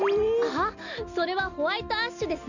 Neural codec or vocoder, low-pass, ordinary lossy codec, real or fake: none; 7.2 kHz; none; real